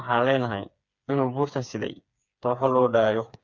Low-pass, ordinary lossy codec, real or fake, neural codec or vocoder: 7.2 kHz; Opus, 64 kbps; fake; codec, 16 kHz, 4 kbps, FreqCodec, smaller model